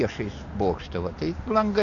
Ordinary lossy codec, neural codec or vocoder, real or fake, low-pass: AAC, 48 kbps; none; real; 7.2 kHz